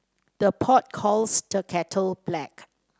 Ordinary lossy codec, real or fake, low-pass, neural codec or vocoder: none; real; none; none